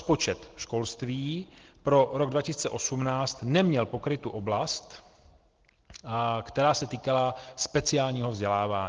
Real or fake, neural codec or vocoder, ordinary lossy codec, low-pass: real; none; Opus, 16 kbps; 7.2 kHz